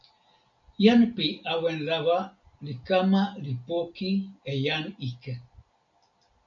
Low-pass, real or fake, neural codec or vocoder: 7.2 kHz; real; none